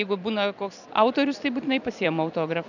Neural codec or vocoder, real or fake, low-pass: vocoder, 44.1 kHz, 128 mel bands every 256 samples, BigVGAN v2; fake; 7.2 kHz